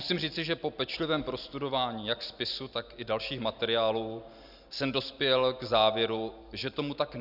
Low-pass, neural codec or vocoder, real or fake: 5.4 kHz; none; real